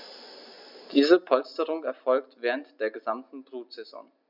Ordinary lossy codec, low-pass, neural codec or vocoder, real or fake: none; 5.4 kHz; none; real